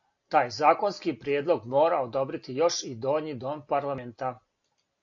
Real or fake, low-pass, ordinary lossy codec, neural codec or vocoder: real; 7.2 kHz; AAC, 48 kbps; none